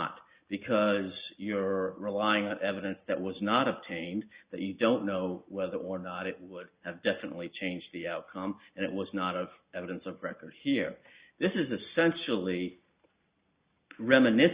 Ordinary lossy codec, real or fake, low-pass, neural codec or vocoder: Opus, 64 kbps; real; 3.6 kHz; none